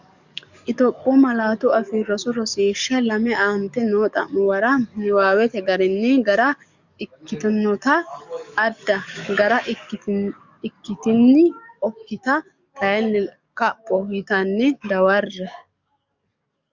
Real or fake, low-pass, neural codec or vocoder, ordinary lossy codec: fake; 7.2 kHz; codec, 44.1 kHz, 7.8 kbps, DAC; Opus, 64 kbps